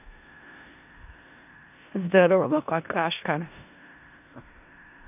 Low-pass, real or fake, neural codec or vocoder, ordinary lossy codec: 3.6 kHz; fake; codec, 16 kHz in and 24 kHz out, 0.4 kbps, LongCat-Audio-Codec, four codebook decoder; none